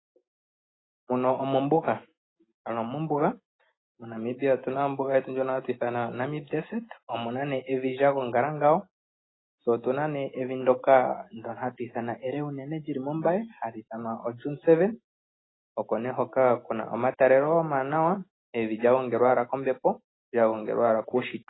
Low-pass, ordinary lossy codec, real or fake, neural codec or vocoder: 7.2 kHz; AAC, 16 kbps; real; none